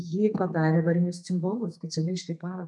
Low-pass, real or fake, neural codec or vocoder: 10.8 kHz; fake; codec, 32 kHz, 1.9 kbps, SNAC